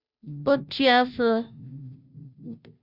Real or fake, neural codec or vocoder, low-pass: fake; codec, 16 kHz, 0.5 kbps, FunCodec, trained on Chinese and English, 25 frames a second; 5.4 kHz